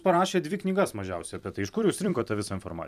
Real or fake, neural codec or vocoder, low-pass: fake; vocoder, 44.1 kHz, 128 mel bands every 256 samples, BigVGAN v2; 14.4 kHz